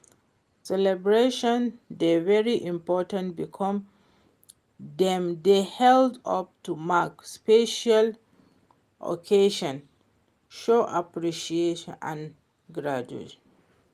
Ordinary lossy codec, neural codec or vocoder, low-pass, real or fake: Opus, 32 kbps; none; 14.4 kHz; real